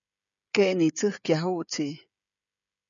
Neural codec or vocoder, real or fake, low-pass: codec, 16 kHz, 16 kbps, FreqCodec, smaller model; fake; 7.2 kHz